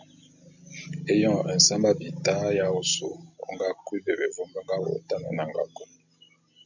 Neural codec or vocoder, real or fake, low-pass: none; real; 7.2 kHz